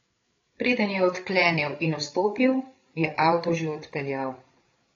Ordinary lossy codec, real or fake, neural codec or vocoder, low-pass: AAC, 32 kbps; fake; codec, 16 kHz, 8 kbps, FreqCodec, larger model; 7.2 kHz